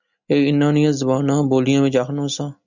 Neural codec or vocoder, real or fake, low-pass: none; real; 7.2 kHz